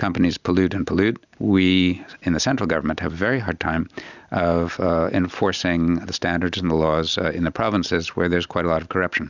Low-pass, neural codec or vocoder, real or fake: 7.2 kHz; none; real